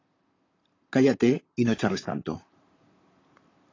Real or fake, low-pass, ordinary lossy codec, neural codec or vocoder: fake; 7.2 kHz; AAC, 32 kbps; vocoder, 44.1 kHz, 128 mel bands, Pupu-Vocoder